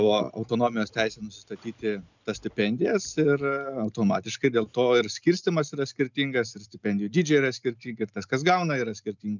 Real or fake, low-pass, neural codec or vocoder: real; 7.2 kHz; none